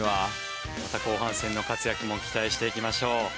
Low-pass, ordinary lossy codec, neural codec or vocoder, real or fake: none; none; none; real